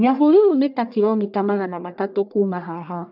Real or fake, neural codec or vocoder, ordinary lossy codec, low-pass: fake; codec, 44.1 kHz, 1.7 kbps, Pupu-Codec; AAC, 48 kbps; 5.4 kHz